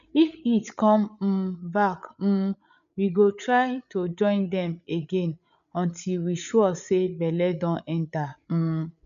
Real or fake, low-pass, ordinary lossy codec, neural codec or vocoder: fake; 7.2 kHz; none; codec, 16 kHz, 8 kbps, FreqCodec, larger model